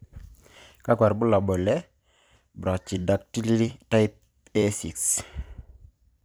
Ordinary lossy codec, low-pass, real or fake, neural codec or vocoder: none; none; fake; vocoder, 44.1 kHz, 128 mel bands every 256 samples, BigVGAN v2